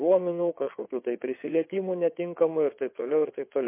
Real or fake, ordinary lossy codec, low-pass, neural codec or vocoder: fake; MP3, 24 kbps; 3.6 kHz; vocoder, 22.05 kHz, 80 mel bands, WaveNeXt